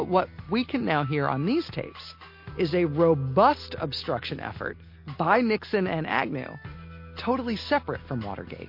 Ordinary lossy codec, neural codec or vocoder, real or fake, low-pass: MP3, 32 kbps; none; real; 5.4 kHz